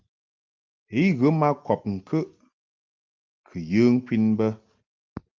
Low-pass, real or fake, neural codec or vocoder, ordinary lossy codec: 7.2 kHz; real; none; Opus, 32 kbps